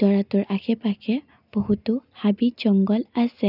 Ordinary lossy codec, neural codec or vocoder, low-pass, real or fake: none; none; 5.4 kHz; real